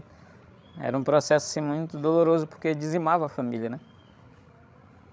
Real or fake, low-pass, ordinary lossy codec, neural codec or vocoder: fake; none; none; codec, 16 kHz, 16 kbps, FreqCodec, larger model